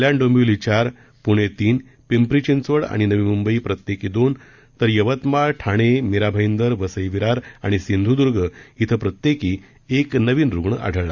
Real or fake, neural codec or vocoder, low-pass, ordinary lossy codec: real; none; 7.2 kHz; Opus, 64 kbps